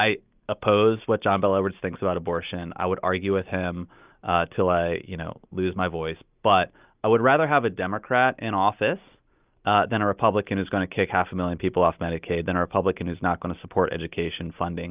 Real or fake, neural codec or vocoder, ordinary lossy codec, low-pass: real; none; Opus, 24 kbps; 3.6 kHz